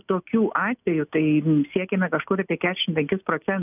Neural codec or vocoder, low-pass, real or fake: none; 3.6 kHz; real